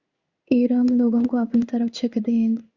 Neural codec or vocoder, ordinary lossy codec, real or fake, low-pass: codec, 16 kHz in and 24 kHz out, 1 kbps, XY-Tokenizer; Opus, 64 kbps; fake; 7.2 kHz